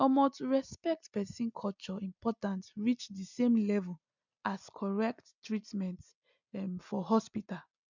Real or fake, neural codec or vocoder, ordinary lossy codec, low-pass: real; none; none; 7.2 kHz